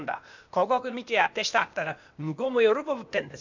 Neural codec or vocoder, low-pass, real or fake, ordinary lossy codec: codec, 16 kHz, 0.8 kbps, ZipCodec; 7.2 kHz; fake; none